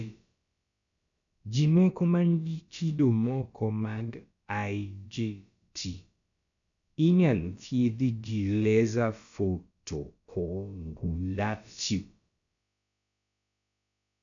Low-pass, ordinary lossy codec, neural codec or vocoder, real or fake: 7.2 kHz; MP3, 96 kbps; codec, 16 kHz, about 1 kbps, DyCAST, with the encoder's durations; fake